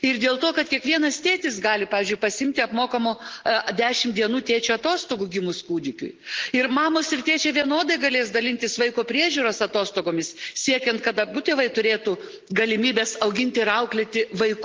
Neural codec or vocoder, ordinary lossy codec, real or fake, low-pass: none; Opus, 16 kbps; real; 7.2 kHz